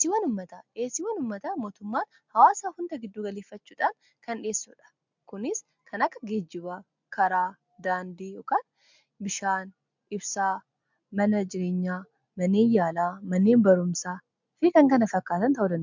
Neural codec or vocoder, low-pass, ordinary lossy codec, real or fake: none; 7.2 kHz; MP3, 64 kbps; real